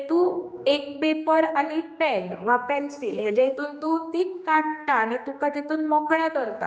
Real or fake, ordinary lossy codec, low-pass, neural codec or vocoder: fake; none; none; codec, 16 kHz, 2 kbps, X-Codec, HuBERT features, trained on general audio